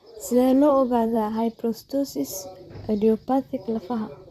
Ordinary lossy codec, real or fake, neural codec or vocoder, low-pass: AAC, 96 kbps; fake; vocoder, 44.1 kHz, 128 mel bands, Pupu-Vocoder; 14.4 kHz